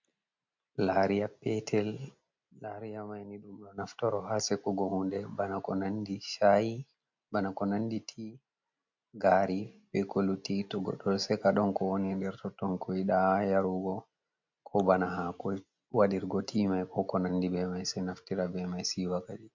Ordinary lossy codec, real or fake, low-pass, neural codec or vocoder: MP3, 48 kbps; real; 7.2 kHz; none